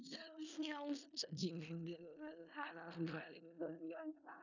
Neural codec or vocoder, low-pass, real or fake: codec, 16 kHz in and 24 kHz out, 0.4 kbps, LongCat-Audio-Codec, four codebook decoder; 7.2 kHz; fake